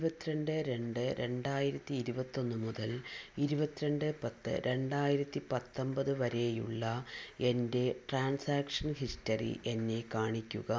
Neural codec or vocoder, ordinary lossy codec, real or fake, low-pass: none; none; real; none